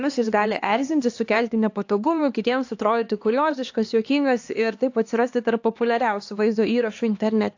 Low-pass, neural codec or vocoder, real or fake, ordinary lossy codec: 7.2 kHz; codec, 16 kHz, 2 kbps, X-Codec, HuBERT features, trained on LibriSpeech; fake; AAC, 48 kbps